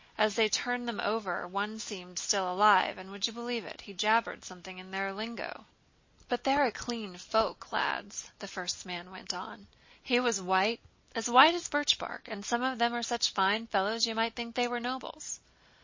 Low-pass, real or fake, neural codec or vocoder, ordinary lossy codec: 7.2 kHz; real; none; MP3, 32 kbps